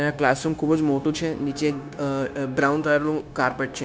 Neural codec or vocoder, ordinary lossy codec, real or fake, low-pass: codec, 16 kHz, 0.9 kbps, LongCat-Audio-Codec; none; fake; none